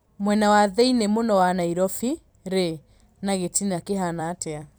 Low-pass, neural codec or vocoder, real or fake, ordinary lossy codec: none; vocoder, 44.1 kHz, 128 mel bands every 256 samples, BigVGAN v2; fake; none